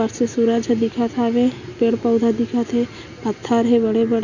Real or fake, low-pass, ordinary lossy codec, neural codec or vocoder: real; 7.2 kHz; none; none